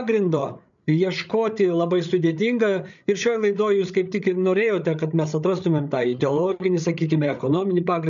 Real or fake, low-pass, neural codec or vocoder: fake; 7.2 kHz; codec, 16 kHz, 8 kbps, FreqCodec, larger model